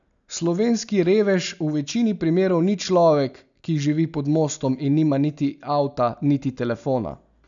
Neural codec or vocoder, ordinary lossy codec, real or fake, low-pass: none; none; real; 7.2 kHz